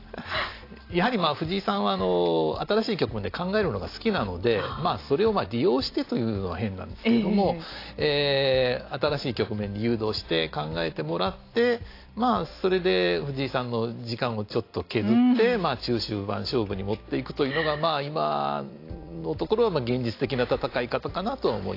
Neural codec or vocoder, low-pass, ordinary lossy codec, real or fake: none; 5.4 kHz; AAC, 32 kbps; real